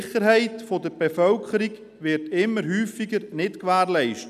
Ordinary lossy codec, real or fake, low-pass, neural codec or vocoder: none; real; 14.4 kHz; none